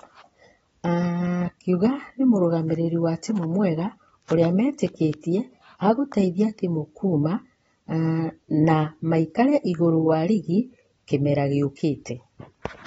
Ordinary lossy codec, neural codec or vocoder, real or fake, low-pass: AAC, 24 kbps; none; real; 19.8 kHz